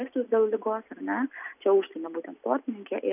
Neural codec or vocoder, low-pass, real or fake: none; 3.6 kHz; real